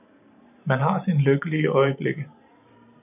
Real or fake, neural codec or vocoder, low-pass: fake; vocoder, 44.1 kHz, 128 mel bands every 512 samples, BigVGAN v2; 3.6 kHz